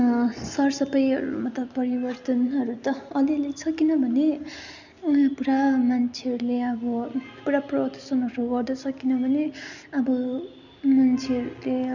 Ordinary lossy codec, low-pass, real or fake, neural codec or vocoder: none; 7.2 kHz; real; none